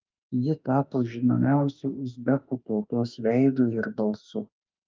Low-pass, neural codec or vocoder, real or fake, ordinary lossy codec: 7.2 kHz; autoencoder, 48 kHz, 32 numbers a frame, DAC-VAE, trained on Japanese speech; fake; Opus, 24 kbps